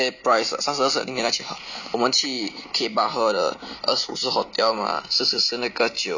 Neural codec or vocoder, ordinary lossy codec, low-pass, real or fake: none; none; 7.2 kHz; real